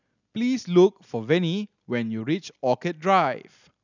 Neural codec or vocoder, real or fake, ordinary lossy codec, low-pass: none; real; none; 7.2 kHz